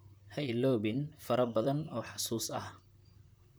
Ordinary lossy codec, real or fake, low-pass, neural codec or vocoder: none; fake; none; vocoder, 44.1 kHz, 128 mel bands, Pupu-Vocoder